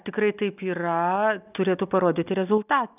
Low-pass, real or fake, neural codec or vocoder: 3.6 kHz; real; none